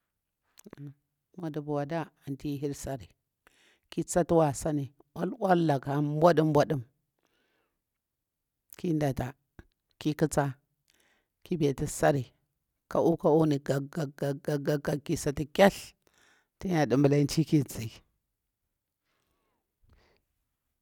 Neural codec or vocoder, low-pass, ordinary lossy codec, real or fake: none; 19.8 kHz; none; real